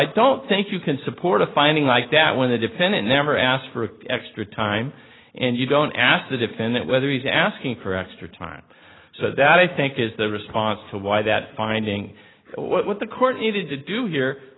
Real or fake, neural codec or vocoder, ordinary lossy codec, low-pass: real; none; AAC, 16 kbps; 7.2 kHz